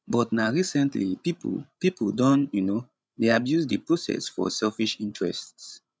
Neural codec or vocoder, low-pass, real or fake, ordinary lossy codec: codec, 16 kHz, 16 kbps, FreqCodec, larger model; none; fake; none